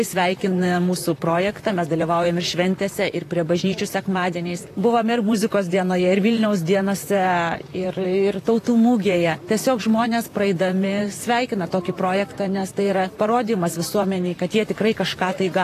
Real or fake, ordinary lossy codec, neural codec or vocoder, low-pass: fake; AAC, 48 kbps; vocoder, 44.1 kHz, 128 mel bands, Pupu-Vocoder; 14.4 kHz